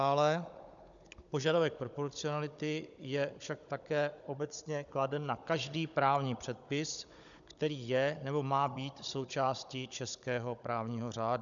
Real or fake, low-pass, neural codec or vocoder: fake; 7.2 kHz; codec, 16 kHz, 16 kbps, FunCodec, trained on Chinese and English, 50 frames a second